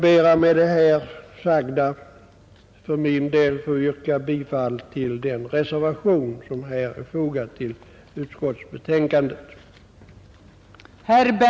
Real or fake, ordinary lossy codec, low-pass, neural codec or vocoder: real; none; none; none